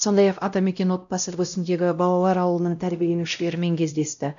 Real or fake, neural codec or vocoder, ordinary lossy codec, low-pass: fake; codec, 16 kHz, 0.5 kbps, X-Codec, WavLM features, trained on Multilingual LibriSpeech; none; 7.2 kHz